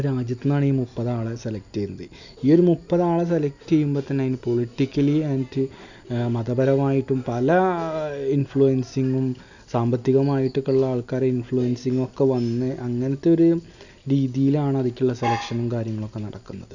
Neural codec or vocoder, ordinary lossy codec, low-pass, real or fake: none; none; 7.2 kHz; real